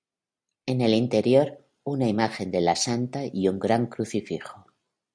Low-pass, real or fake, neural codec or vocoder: 9.9 kHz; real; none